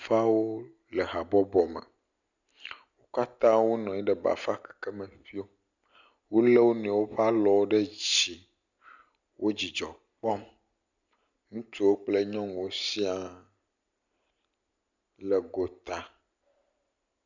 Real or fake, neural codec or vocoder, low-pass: real; none; 7.2 kHz